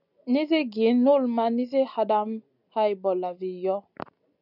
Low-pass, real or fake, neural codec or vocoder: 5.4 kHz; real; none